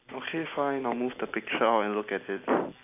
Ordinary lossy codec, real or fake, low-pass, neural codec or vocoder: none; real; 3.6 kHz; none